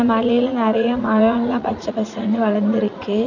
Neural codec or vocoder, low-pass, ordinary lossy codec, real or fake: vocoder, 44.1 kHz, 80 mel bands, Vocos; 7.2 kHz; none; fake